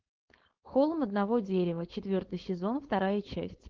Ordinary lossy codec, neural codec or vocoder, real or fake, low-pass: Opus, 16 kbps; codec, 16 kHz, 4.8 kbps, FACodec; fake; 7.2 kHz